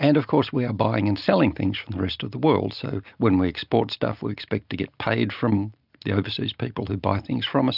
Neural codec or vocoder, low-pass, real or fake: none; 5.4 kHz; real